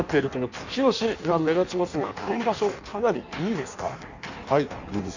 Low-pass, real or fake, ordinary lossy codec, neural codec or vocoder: 7.2 kHz; fake; none; codec, 16 kHz in and 24 kHz out, 1.1 kbps, FireRedTTS-2 codec